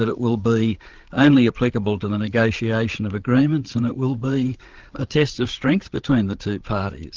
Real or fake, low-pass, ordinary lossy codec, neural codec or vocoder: fake; 7.2 kHz; Opus, 16 kbps; codec, 16 kHz, 8 kbps, FreqCodec, larger model